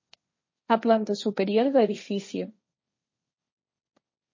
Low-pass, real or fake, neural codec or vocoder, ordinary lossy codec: 7.2 kHz; fake; codec, 16 kHz, 1.1 kbps, Voila-Tokenizer; MP3, 32 kbps